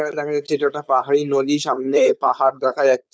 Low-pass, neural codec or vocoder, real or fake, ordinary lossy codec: none; codec, 16 kHz, 4.8 kbps, FACodec; fake; none